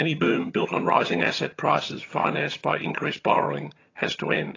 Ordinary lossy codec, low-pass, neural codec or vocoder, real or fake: AAC, 32 kbps; 7.2 kHz; vocoder, 22.05 kHz, 80 mel bands, HiFi-GAN; fake